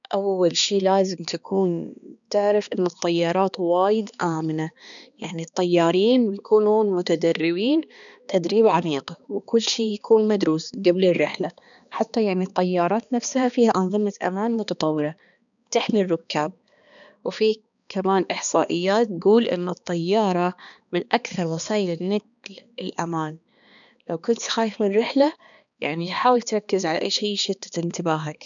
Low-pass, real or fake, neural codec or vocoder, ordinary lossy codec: 7.2 kHz; fake; codec, 16 kHz, 2 kbps, X-Codec, HuBERT features, trained on balanced general audio; none